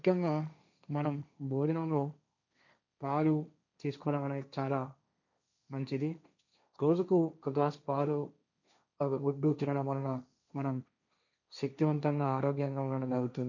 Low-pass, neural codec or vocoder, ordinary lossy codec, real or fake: 7.2 kHz; codec, 16 kHz, 1.1 kbps, Voila-Tokenizer; none; fake